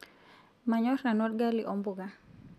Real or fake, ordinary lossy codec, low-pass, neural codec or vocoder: real; none; 14.4 kHz; none